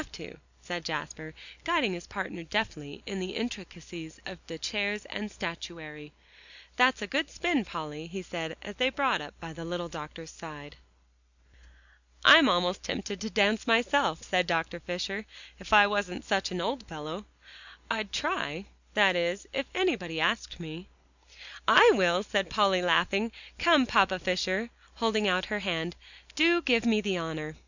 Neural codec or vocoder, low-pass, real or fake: none; 7.2 kHz; real